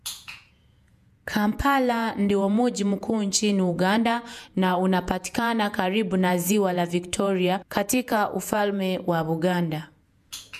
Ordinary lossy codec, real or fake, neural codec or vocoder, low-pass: none; fake; vocoder, 48 kHz, 128 mel bands, Vocos; 14.4 kHz